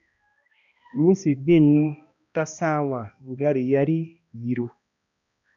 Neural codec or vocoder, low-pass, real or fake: codec, 16 kHz, 1 kbps, X-Codec, HuBERT features, trained on balanced general audio; 7.2 kHz; fake